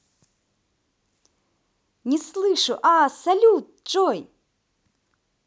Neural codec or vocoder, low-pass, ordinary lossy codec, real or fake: none; none; none; real